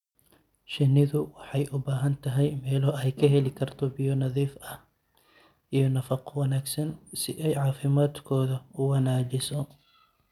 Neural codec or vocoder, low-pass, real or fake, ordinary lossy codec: none; 19.8 kHz; real; none